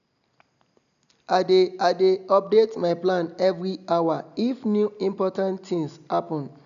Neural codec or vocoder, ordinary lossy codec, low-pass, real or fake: none; none; 7.2 kHz; real